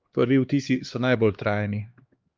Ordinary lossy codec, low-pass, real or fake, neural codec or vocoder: Opus, 32 kbps; 7.2 kHz; fake; codec, 16 kHz, 2 kbps, X-Codec, HuBERT features, trained on LibriSpeech